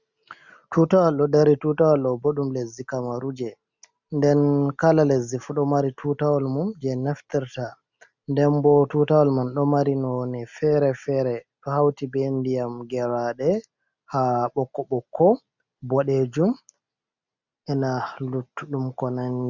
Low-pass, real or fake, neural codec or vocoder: 7.2 kHz; real; none